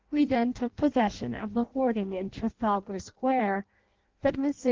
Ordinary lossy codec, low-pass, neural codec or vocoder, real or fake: Opus, 16 kbps; 7.2 kHz; codec, 16 kHz in and 24 kHz out, 0.6 kbps, FireRedTTS-2 codec; fake